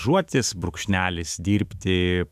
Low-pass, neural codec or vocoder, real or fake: 14.4 kHz; autoencoder, 48 kHz, 128 numbers a frame, DAC-VAE, trained on Japanese speech; fake